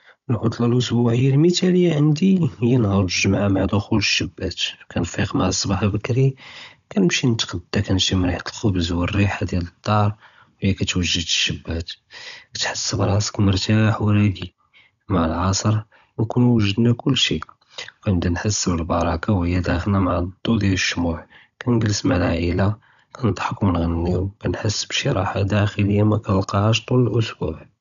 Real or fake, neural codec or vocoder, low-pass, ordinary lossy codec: fake; codec, 16 kHz, 16 kbps, FunCodec, trained on Chinese and English, 50 frames a second; 7.2 kHz; none